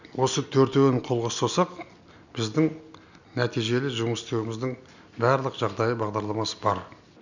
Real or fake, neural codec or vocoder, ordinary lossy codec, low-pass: real; none; none; 7.2 kHz